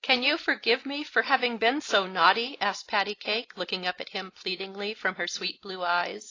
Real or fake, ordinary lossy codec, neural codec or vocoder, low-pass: real; AAC, 32 kbps; none; 7.2 kHz